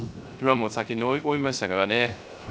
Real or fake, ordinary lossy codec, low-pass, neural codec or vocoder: fake; none; none; codec, 16 kHz, 0.3 kbps, FocalCodec